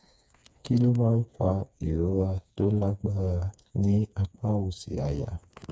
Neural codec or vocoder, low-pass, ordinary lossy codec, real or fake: codec, 16 kHz, 4 kbps, FreqCodec, smaller model; none; none; fake